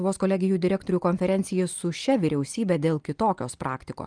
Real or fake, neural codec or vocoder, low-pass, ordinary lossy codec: real; none; 9.9 kHz; Opus, 32 kbps